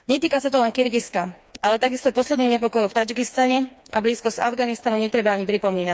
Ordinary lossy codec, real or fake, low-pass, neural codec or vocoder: none; fake; none; codec, 16 kHz, 2 kbps, FreqCodec, smaller model